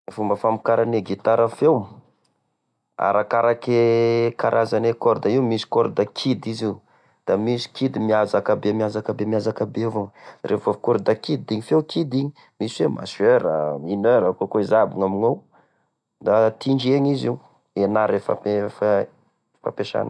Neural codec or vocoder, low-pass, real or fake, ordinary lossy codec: none; none; real; none